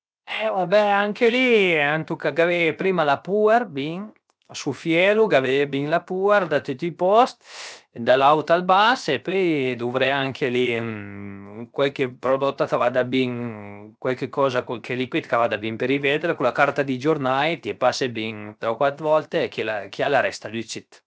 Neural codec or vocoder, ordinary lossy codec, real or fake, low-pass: codec, 16 kHz, 0.7 kbps, FocalCodec; none; fake; none